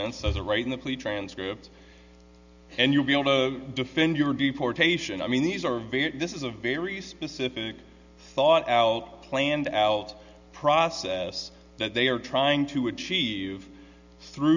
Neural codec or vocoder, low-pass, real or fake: none; 7.2 kHz; real